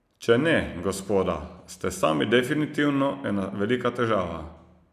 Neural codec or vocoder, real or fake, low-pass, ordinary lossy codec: none; real; 14.4 kHz; none